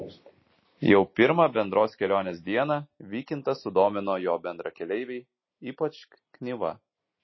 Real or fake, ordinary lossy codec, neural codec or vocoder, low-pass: real; MP3, 24 kbps; none; 7.2 kHz